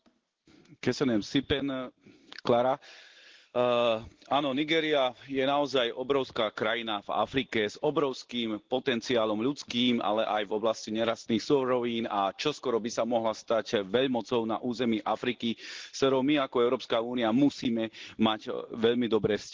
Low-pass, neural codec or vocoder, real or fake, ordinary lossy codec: 7.2 kHz; none; real; Opus, 16 kbps